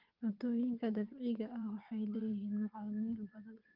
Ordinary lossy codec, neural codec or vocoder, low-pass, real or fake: Opus, 24 kbps; vocoder, 22.05 kHz, 80 mel bands, WaveNeXt; 5.4 kHz; fake